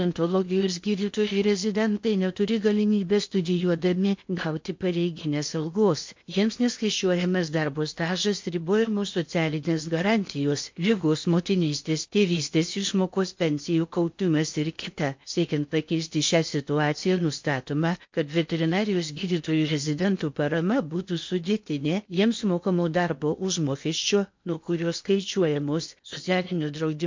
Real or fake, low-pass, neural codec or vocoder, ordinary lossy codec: fake; 7.2 kHz; codec, 16 kHz in and 24 kHz out, 0.8 kbps, FocalCodec, streaming, 65536 codes; MP3, 48 kbps